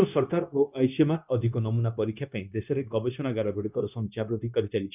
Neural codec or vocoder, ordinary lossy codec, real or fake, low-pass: codec, 16 kHz, 0.9 kbps, LongCat-Audio-Codec; none; fake; 3.6 kHz